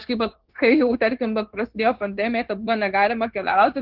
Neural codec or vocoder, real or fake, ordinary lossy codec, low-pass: codec, 16 kHz, 0.9 kbps, LongCat-Audio-Codec; fake; Opus, 16 kbps; 5.4 kHz